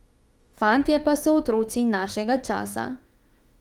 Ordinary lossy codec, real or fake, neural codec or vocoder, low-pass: Opus, 24 kbps; fake; autoencoder, 48 kHz, 32 numbers a frame, DAC-VAE, trained on Japanese speech; 19.8 kHz